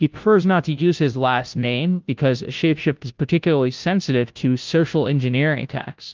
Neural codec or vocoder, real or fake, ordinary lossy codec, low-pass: codec, 16 kHz, 0.5 kbps, FunCodec, trained on Chinese and English, 25 frames a second; fake; Opus, 32 kbps; 7.2 kHz